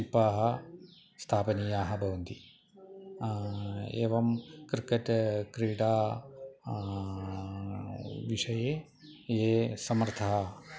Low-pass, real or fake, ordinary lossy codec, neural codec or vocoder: none; real; none; none